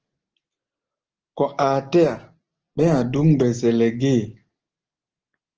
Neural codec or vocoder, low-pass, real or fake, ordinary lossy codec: none; 7.2 kHz; real; Opus, 16 kbps